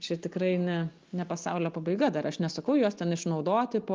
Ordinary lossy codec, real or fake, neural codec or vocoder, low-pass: Opus, 32 kbps; real; none; 7.2 kHz